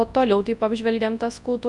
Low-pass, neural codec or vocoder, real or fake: 10.8 kHz; codec, 24 kHz, 0.9 kbps, WavTokenizer, large speech release; fake